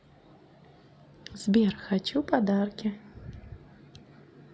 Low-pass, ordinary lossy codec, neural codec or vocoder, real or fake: none; none; none; real